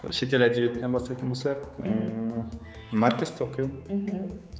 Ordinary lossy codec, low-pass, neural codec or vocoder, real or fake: none; none; codec, 16 kHz, 4 kbps, X-Codec, HuBERT features, trained on general audio; fake